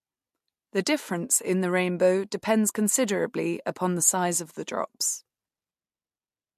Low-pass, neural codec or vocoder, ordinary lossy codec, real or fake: 14.4 kHz; none; MP3, 64 kbps; real